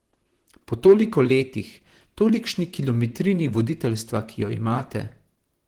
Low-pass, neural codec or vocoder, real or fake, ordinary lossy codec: 19.8 kHz; vocoder, 44.1 kHz, 128 mel bands, Pupu-Vocoder; fake; Opus, 16 kbps